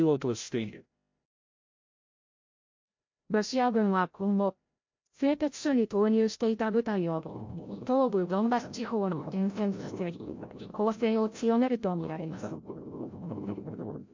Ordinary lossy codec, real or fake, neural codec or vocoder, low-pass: MP3, 48 kbps; fake; codec, 16 kHz, 0.5 kbps, FreqCodec, larger model; 7.2 kHz